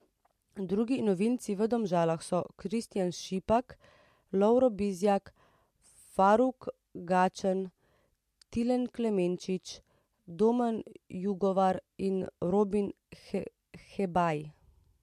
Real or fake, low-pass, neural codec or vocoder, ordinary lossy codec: real; 14.4 kHz; none; MP3, 64 kbps